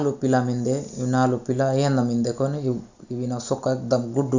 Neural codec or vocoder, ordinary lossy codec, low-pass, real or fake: none; Opus, 64 kbps; 7.2 kHz; real